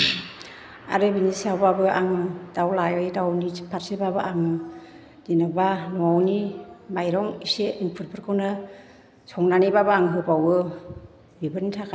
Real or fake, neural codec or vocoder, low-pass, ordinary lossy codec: real; none; none; none